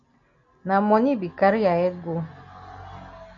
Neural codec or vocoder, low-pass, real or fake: none; 7.2 kHz; real